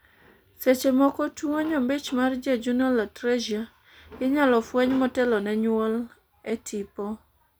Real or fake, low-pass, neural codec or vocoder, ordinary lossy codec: real; none; none; none